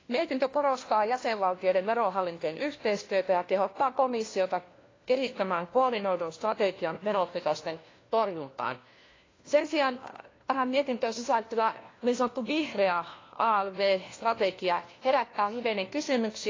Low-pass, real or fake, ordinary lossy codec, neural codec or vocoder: 7.2 kHz; fake; AAC, 32 kbps; codec, 16 kHz, 1 kbps, FunCodec, trained on LibriTTS, 50 frames a second